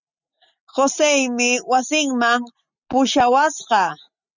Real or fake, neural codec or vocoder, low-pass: real; none; 7.2 kHz